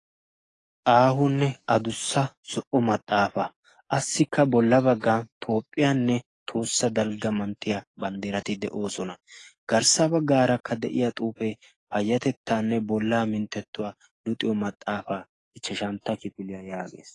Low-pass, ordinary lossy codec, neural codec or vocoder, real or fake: 10.8 kHz; AAC, 32 kbps; codec, 44.1 kHz, 7.8 kbps, DAC; fake